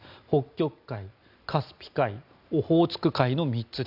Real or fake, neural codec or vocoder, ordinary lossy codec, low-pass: real; none; none; 5.4 kHz